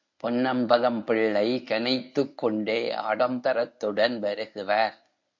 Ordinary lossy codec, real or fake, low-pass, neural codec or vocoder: MP3, 48 kbps; fake; 7.2 kHz; codec, 24 kHz, 0.9 kbps, WavTokenizer, medium speech release version 1